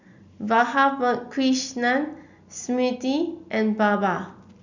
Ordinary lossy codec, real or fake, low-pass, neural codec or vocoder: none; real; 7.2 kHz; none